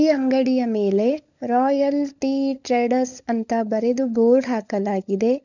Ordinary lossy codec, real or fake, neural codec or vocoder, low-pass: none; fake; codec, 16 kHz, 16 kbps, FunCodec, trained on LibriTTS, 50 frames a second; 7.2 kHz